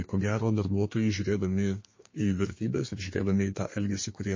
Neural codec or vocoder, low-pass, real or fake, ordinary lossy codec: codec, 32 kHz, 1.9 kbps, SNAC; 7.2 kHz; fake; MP3, 32 kbps